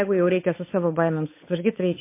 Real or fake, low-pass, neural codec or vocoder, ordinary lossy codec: fake; 3.6 kHz; codec, 16 kHz, 8 kbps, FunCodec, trained on Chinese and English, 25 frames a second; MP3, 24 kbps